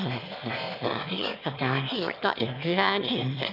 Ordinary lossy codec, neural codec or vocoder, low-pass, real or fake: none; autoencoder, 22.05 kHz, a latent of 192 numbers a frame, VITS, trained on one speaker; 5.4 kHz; fake